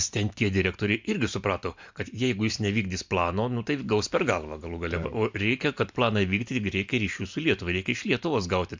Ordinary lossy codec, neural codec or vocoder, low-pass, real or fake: MP3, 64 kbps; none; 7.2 kHz; real